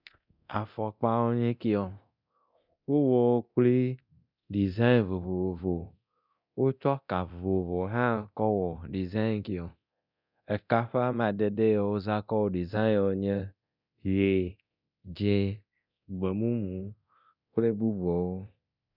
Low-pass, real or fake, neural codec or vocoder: 5.4 kHz; fake; codec, 24 kHz, 0.9 kbps, DualCodec